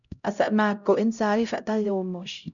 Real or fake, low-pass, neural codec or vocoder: fake; 7.2 kHz; codec, 16 kHz, 0.5 kbps, X-Codec, HuBERT features, trained on LibriSpeech